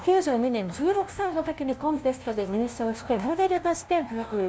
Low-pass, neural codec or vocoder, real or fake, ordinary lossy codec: none; codec, 16 kHz, 0.5 kbps, FunCodec, trained on LibriTTS, 25 frames a second; fake; none